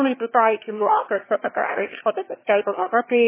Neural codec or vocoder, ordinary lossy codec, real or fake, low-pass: autoencoder, 22.05 kHz, a latent of 192 numbers a frame, VITS, trained on one speaker; MP3, 16 kbps; fake; 3.6 kHz